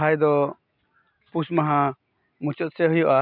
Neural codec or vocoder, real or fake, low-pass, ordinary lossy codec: none; real; 5.4 kHz; none